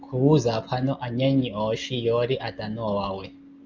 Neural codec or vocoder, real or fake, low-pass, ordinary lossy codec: none; real; 7.2 kHz; Opus, 32 kbps